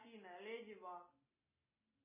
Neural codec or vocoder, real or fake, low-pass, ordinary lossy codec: none; real; 3.6 kHz; MP3, 16 kbps